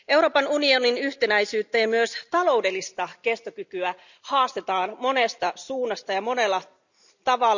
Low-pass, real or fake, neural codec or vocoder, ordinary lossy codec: 7.2 kHz; real; none; none